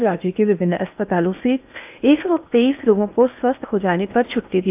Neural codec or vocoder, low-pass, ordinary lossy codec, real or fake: codec, 16 kHz in and 24 kHz out, 0.8 kbps, FocalCodec, streaming, 65536 codes; 3.6 kHz; AAC, 32 kbps; fake